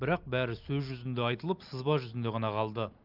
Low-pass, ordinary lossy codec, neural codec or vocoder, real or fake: 5.4 kHz; Opus, 32 kbps; none; real